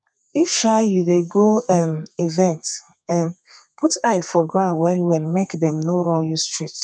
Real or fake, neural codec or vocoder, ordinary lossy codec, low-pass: fake; codec, 32 kHz, 1.9 kbps, SNAC; none; 9.9 kHz